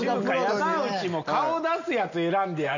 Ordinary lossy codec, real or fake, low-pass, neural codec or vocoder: none; real; 7.2 kHz; none